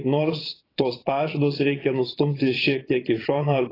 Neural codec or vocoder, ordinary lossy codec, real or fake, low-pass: vocoder, 22.05 kHz, 80 mel bands, Vocos; AAC, 24 kbps; fake; 5.4 kHz